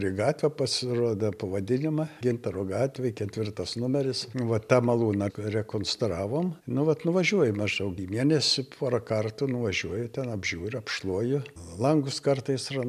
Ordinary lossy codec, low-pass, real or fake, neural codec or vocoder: MP3, 96 kbps; 14.4 kHz; real; none